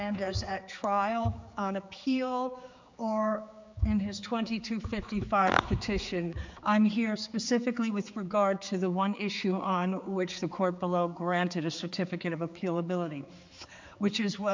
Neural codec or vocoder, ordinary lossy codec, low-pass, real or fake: codec, 16 kHz, 4 kbps, X-Codec, HuBERT features, trained on general audio; MP3, 64 kbps; 7.2 kHz; fake